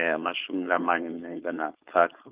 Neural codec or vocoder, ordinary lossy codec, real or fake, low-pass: codec, 16 kHz, 4.8 kbps, FACodec; Opus, 24 kbps; fake; 3.6 kHz